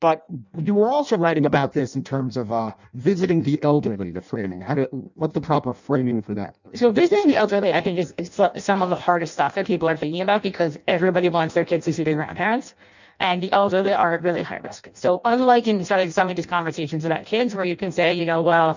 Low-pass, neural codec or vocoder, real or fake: 7.2 kHz; codec, 16 kHz in and 24 kHz out, 0.6 kbps, FireRedTTS-2 codec; fake